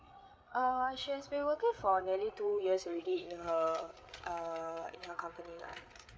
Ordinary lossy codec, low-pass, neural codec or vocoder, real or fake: none; none; codec, 16 kHz, 8 kbps, FreqCodec, larger model; fake